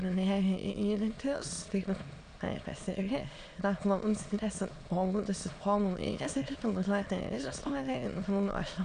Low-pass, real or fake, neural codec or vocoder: 9.9 kHz; fake; autoencoder, 22.05 kHz, a latent of 192 numbers a frame, VITS, trained on many speakers